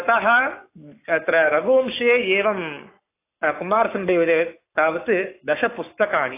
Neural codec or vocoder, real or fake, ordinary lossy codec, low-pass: codec, 44.1 kHz, 7.8 kbps, Pupu-Codec; fake; AAC, 24 kbps; 3.6 kHz